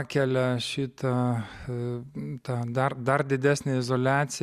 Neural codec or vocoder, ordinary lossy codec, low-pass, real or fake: none; Opus, 64 kbps; 14.4 kHz; real